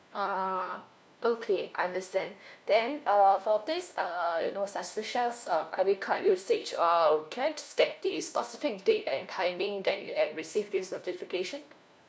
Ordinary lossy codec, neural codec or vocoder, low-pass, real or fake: none; codec, 16 kHz, 1 kbps, FunCodec, trained on LibriTTS, 50 frames a second; none; fake